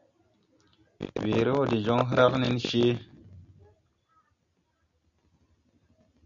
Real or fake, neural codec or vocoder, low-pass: real; none; 7.2 kHz